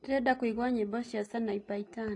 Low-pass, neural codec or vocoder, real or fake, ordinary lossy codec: 10.8 kHz; none; real; AAC, 48 kbps